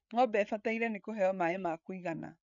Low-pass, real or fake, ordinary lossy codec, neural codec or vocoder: 7.2 kHz; fake; none; codec, 16 kHz, 8 kbps, FreqCodec, larger model